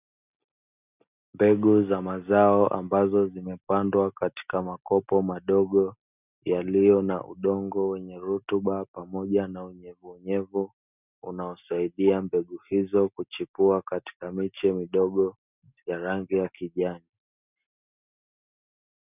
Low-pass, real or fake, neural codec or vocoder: 3.6 kHz; real; none